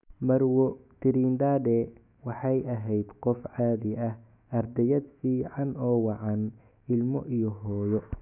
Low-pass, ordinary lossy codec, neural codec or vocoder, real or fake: 3.6 kHz; none; autoencoder, 48 kHz, 128 numbers a frame, DAC-VAE, trained on Japanese speech; fake